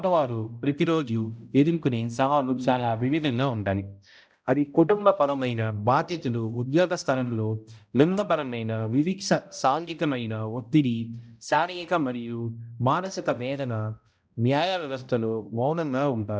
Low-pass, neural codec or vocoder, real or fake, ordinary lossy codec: none; codec, 16 kHz, 0.5 kbps, X-Codec, HuBERT features, trained on balanced general audio; fake; none